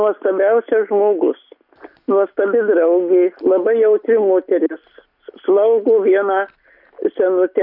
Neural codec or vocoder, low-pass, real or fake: none; 5.4 kHz; real